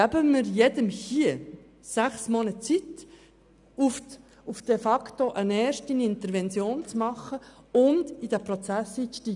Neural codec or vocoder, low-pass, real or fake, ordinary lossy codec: none; 10.8 kHz; real; none